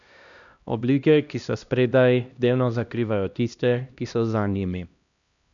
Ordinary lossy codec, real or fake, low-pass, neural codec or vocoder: none; fake; 7.2 kHz; codec, 16 kHz, 1 kbps, X-Codec, HuBERT features, trained on LibriSpeech